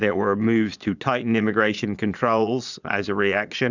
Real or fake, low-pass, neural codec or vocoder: fake; 7.2 kHz; vocoder, 22.05 kHz, 80 mel bands, Vocos